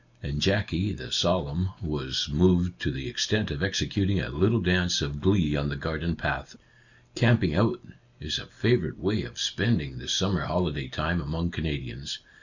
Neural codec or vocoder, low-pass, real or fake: none; 7.2 kHz; real